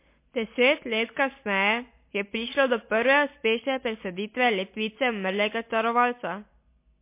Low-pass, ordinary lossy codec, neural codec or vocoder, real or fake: 3.6 kHz; MP3, 24 kbps; none; real